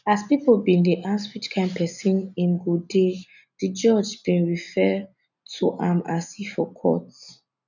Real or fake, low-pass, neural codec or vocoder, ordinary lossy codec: real; 7.2 kHz; none; none